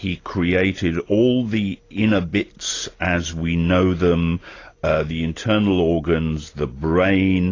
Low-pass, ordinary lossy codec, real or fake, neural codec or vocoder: 7.2 kHz; AAC, 32 kbps; real; none